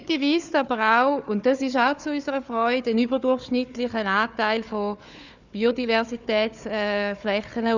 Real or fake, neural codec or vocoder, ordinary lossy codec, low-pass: fake; codec, 16 kHz, 4 kbps, FunCodec, trained on Chinese and English, 50 frames a second; none; 7.2 kHz